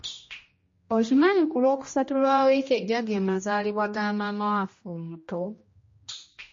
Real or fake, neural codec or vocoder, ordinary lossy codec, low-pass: fake; codec, 16 kHz, 1 kbps, X-Codec, HuBERT features, trained on general audio; MP3, 32 kbps; 7.2 kHz